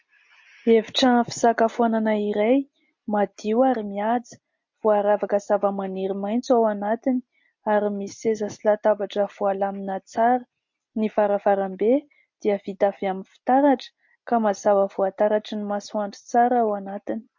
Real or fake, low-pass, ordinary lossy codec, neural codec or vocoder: real; 7.2 kHz; MP3, 48 kbps; none